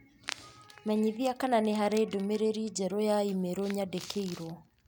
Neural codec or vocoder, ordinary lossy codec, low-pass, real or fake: none; none; none; real